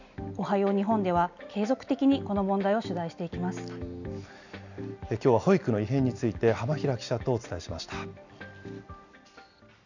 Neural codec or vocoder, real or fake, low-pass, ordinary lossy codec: none; real; 7.2 kHz; none